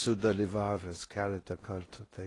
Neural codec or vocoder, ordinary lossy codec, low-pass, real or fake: codec, 16 kHz in and 24 kHz out, 0.6 kbps, FocalCodec, streaming, 4096 codes; AAC, 32 kbps; 10.8 kHz; fake